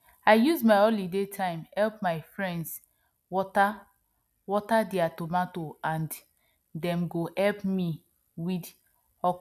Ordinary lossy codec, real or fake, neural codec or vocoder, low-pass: none; real; none; 14.4 kHz